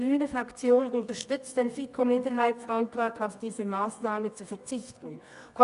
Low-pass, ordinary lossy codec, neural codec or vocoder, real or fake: 10.8 kHz; none; codec, 24 kHz, 0.9 kbps, WavTokenizer, medium music audio release; fake